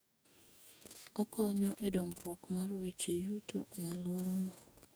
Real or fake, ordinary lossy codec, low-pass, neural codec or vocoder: fake; none; none; codec, 44.1 kHz, 2.6 kbps, DAC